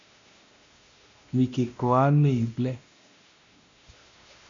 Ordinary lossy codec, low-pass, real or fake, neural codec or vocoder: MP3, 64 kbps; 7.2 kHz; fake; codec, 16 kHz, 1 kbps, X-Codec, WavLM features, trained on Multilingual LibriSpeech